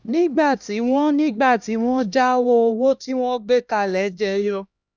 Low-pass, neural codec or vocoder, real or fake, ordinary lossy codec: none; codec, 16 kHz, 1 kbps, X-Codec, HuBERT features, trained on LibriSpeech; fake; none